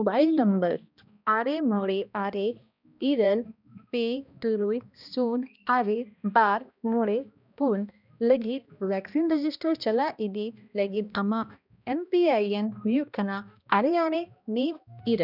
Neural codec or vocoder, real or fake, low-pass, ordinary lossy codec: codec, 16 kHz, 1 kbps, X-Codec, HuBERT features, trained on balanced general audio; fake; 5.4 kHz; none